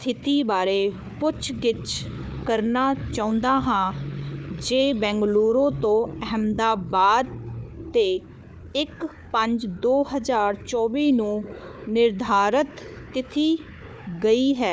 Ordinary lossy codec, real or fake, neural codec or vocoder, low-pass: none; fake; codec, 16 kHz, 4 kbps, FunCodec, trained on Chinese and English, 50 frames a second; none